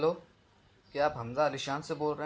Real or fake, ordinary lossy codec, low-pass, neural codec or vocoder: real; none; none; none